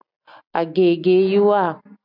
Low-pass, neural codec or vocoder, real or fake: 5.4 kHz; none; real